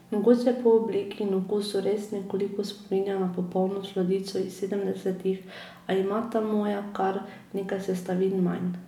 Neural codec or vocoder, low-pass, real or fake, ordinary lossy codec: none; 19.8 kHz; real; none